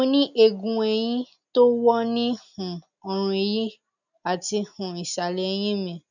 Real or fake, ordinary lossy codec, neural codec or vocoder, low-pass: real; none; none; 7.2 kHz